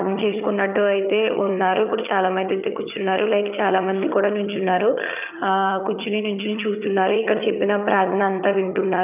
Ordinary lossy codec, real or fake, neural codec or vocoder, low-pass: none; fake; vocoder, 22.05 kHz, 80 mel bands, HiFi-GAN; 3.6 kHz